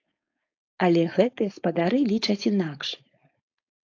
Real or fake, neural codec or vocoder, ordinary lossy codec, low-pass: fake; codec, 16 kHz, 4.8 kbps, FACodec; AAC, 48 kbps; 7.2 kHz